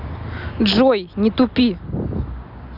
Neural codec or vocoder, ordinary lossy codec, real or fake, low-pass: none; none; real; 5.4 kHz